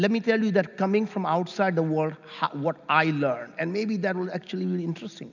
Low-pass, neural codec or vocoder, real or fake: 7.2 kHz; none; real